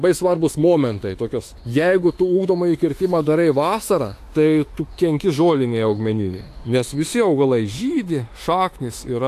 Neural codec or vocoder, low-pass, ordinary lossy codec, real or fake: autoencoder, 48 kHz, 32 numbers a frame, DAC-VAE, trained on Japanese speech; 14.4 kHz; AAC, 64 kbps; fake